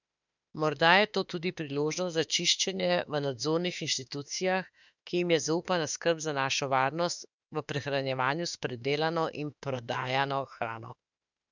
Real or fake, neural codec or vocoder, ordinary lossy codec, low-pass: fake; autoencoder, 48 kHz, 32 numbers a frame, DAC-VAE, trained on Japanese speech; none; 7.2 kHz